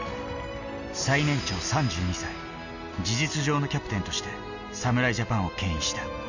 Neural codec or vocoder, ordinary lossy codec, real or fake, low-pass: none; none; real; 7.2 kHz